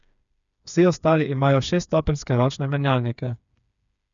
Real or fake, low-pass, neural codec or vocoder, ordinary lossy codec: fake; 7.2 kHz; codec, 16 kHz, 4 kbps, FreqCodec, smaller model; none